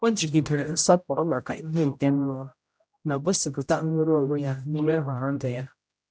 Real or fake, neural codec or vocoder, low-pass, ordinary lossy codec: fake; codec, 16 kHz, 0.5 kbps, X-Codec, HuBERT features, trained on general audio; none; none